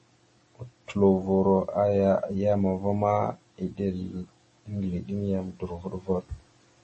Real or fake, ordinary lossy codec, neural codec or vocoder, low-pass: real; MP3, 32 kbps; none; 10.8 kHz